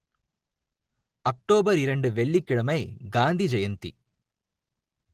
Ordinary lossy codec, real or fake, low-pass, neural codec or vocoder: Opus, 16 kbps; real; 14.4 kHz; none